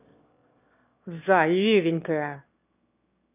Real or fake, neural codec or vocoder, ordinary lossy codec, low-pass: fake; autoencoder, 22.05 kHz, a latent of 192 numbers a frame, VITS, trained on one speaker; none; 3.6 kHz